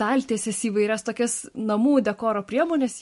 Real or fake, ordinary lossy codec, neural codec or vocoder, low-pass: real; MP3, 48 kbps; none; 14.4 kHz